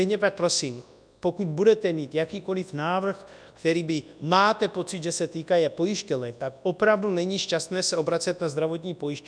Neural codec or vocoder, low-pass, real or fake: codec, 24 kHz, 0.9 kbps, WavTokenizer, large speech release; 9.9 kHz; fake